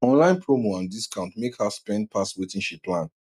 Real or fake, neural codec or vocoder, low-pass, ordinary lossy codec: real; none; 14.4 kHz; none